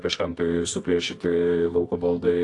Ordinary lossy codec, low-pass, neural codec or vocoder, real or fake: AAC, 48 kbps; 10.8 kHz; codec, 24 kHz, 0.9 kbps, WavTokenizer, medium music audio release; fake